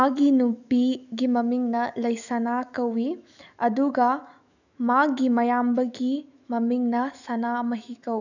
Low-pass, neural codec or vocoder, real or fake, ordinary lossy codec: 7.2 kHz; autoencoder, 48 kHz, 128 numbers a frame, DAC-VAE, trained on Japanese speech; fake; none